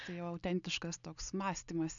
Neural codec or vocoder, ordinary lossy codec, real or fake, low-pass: none; MP3, 96 kbps; real; 7.2 kHz